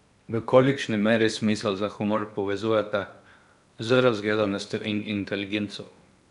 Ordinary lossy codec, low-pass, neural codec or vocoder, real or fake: none; 10.8 kHz; codec, 16 kHz in and 24 kHz out, 0.8 kbps, FocalCodec, streaming, 65536 codes; fake